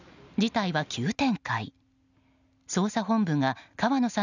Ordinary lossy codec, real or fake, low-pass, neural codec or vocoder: none; real; 7.2 kHz; none